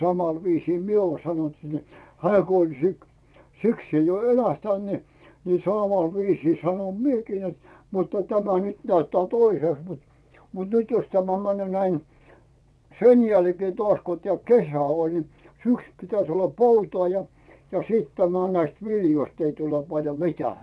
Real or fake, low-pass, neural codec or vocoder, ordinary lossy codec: fake; none; vocoder, 22.05 kHz, 80 mel bands, WaveNeXt; none